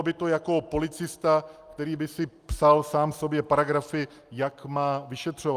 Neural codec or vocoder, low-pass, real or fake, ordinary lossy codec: none; 14.4 kHz; real; Opus, 32 kbps